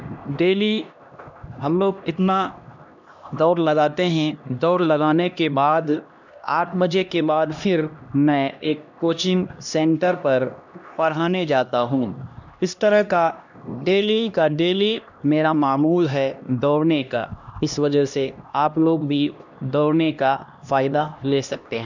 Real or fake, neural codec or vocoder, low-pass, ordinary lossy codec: fake; codec, 16 kHz, 1 kbps, X-Codec, HuBERT features, trained on LibriSpeech; 7.2 kHz; none